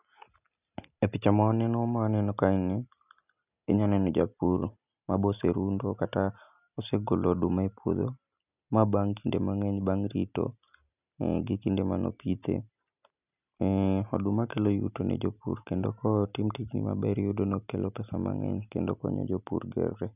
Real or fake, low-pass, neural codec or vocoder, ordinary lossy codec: real; 3.6 kHz; none; none